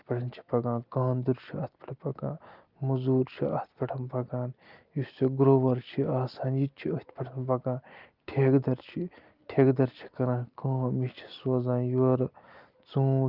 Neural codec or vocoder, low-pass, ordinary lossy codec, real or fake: none; 5.4 kHz; Opus, 32 kbps; real